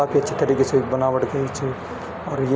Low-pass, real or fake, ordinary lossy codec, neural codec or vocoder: none; real; none; none